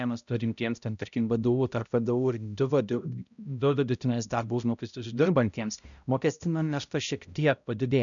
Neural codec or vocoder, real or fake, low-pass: codec, 16 kHz, 0.5 kbps, X-Codec, HuBERT features, trained on balanced general audio; fake; 7.2 kHz